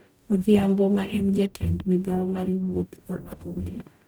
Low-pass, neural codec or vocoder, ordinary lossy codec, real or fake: none; codec, 44.1 kHz, 0.9 kbps, DAC; none; fake